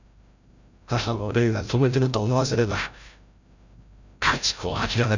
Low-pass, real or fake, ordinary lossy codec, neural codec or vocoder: 7.2 kHz; fake; none; codec, 16 kHz, 0.5 kbps, FreqCodec, larger model